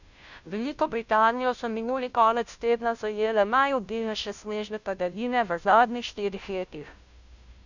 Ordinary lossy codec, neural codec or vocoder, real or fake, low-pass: none; codec, 16 kHz, 0.5 kbps, FunCodec, trained on Chinese and English, 25 frames a second; fake; 7.2 kHz